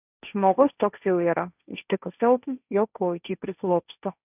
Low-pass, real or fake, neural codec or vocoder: 3.6 kHz; fake; codec, 16 kHz, 1.1 kbps, Voila-Tokenizer